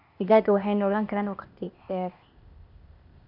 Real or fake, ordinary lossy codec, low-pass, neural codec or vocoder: fake; none; 5.4 kHz; codec, 16 kHz, 0.8 kbps, ZipCodec